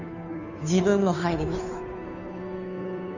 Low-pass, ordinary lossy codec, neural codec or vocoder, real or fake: 7.2 kHz; none; codec, 16 kHz, 2 kbps, FunCodec, trained on Chinese and English, 25 frames a second; fake